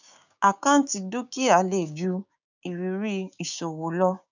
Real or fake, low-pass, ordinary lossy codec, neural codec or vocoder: fake; 7.2 kHz; none; codec, 16 kHz, 6 kbps, DAC